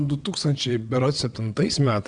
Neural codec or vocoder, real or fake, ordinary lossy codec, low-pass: vocoder, 22.05 kHz, 80 mel bands, WaveNeXt; fake; Opus, 64 kbps; 9.9 kHz